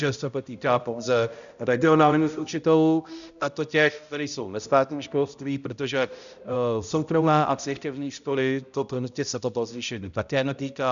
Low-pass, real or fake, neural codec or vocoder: 7.2 kHz; fake; codec, 16 kHz, 0.5 kbps, X-Codec, HuBERT features, trained on balanced general audio